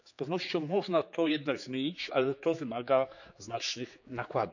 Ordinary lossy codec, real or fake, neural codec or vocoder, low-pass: none; fake; codec, 16 kHz, 4 kbps, X-Codec, HuBERT features, trained on general audio; 7.2 kHz